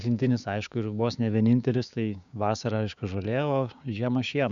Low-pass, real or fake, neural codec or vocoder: 7.2 kHz; fake; codec, 16 kHz, 6 kbps, DAC